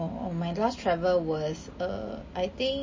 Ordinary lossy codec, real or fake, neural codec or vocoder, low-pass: MP3, 32 kbps; real; none; 7.2 kHz